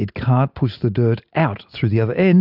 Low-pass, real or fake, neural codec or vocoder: 5.4 kHz; real; none